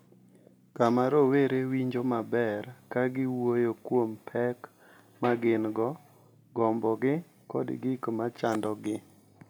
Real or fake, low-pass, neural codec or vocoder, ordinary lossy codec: real; none; none; none